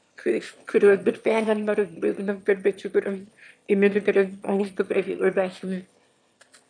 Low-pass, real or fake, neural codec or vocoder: 9.9 kHz; fake; autoencoder, 22.05 kHz, a latent of 192 numbers a frame, VITS, trained on one speaker